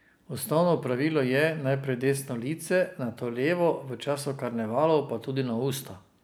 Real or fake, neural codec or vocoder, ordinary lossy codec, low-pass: real; none; none; none